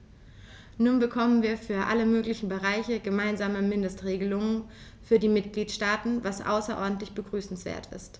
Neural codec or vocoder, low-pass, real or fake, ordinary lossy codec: none; none; real; none